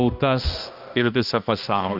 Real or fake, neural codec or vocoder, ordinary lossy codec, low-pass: fake; codec, 16 kHz, 1 kbps, X-Codec, HuBERT features, trained on balanced general audio; Opus, 24 kbps; 5.4 kHz